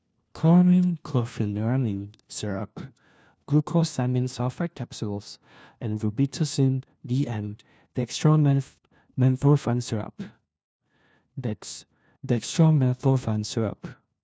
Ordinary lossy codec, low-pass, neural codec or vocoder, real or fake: none; none; codec, 16 kHz, 1 kbps, FunCodec, trained on LibriTTS, 50 frames a second; fake